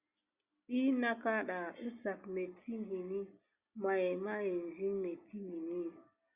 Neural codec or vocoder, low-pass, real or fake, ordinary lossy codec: none; 3.6 kHz; real; Opus, 64 kbps